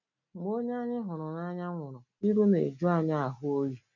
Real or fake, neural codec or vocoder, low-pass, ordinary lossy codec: real; none; 7.2 kHz; none